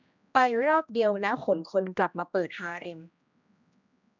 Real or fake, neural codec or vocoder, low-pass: fake; codec, 16 kHz, 1 kbps, X-Codec, HuBERT features, trained on general audio; 7.2 kHz